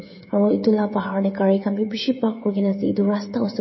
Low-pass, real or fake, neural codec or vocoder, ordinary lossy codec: 7.2 kHz; fake; codec, 16 kHz, 16 kbps, FreqCodec, smaller model; MP3, 24 kbps